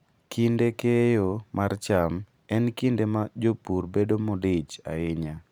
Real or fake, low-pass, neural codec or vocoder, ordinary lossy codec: fake; 19.8 kHz; vocoder, 44.1 kHz, 128 mel bands every 512 samples, BigVGAN v2; none